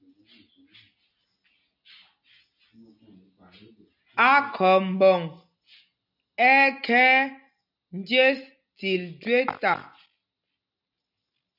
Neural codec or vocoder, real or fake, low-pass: none; real; 5.4 kHz